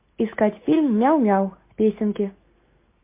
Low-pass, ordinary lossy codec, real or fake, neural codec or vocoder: 3.6 kHz; MP3, 24 kbps; real; none